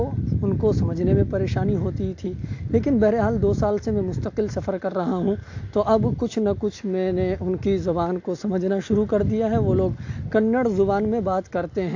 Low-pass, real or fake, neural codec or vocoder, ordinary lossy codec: 7.2 kHz; real; none; AAC, 48 kbps